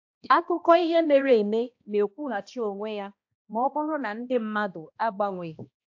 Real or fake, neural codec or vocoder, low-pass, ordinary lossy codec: fake; codec, 16 kHz, 1 kbps, X-Codec, HuBERT features, trained on balanced general audio; 7.2 kHz; AAC, 48 kbps